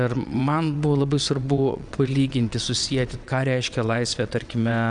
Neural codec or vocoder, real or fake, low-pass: vocoder, 22.05 kHz, 80 mel bands, WaveNeXt; fake; 9.9 kHz